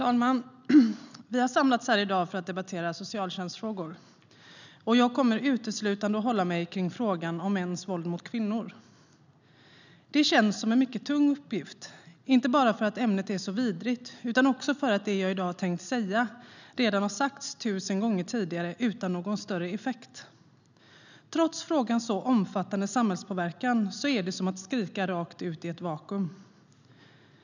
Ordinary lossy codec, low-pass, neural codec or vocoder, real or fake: none; 7.2 kHz; none; real